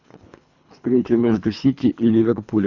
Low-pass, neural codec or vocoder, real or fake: 7.2 kHz; codec, 24 kHz, 3 kbps, HILCodec; fake